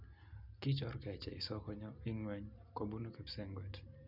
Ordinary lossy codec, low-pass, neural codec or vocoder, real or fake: none; 5.4 kHz; none; real